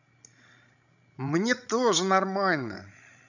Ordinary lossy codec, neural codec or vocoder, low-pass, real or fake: none; codec, 16 kHz, 16 kbps, FreqCodec, larger model; 7.2 kHz; fake